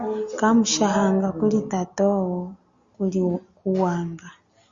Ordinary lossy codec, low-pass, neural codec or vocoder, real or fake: Opus, 64 kbps; 7.2 kHz; none; real